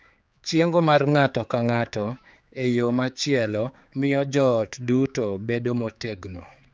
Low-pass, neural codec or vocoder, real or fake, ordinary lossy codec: none; codec, 16 kHz, 4 kbps, X-Codec, HuBERT features, trained on general audio; fake; none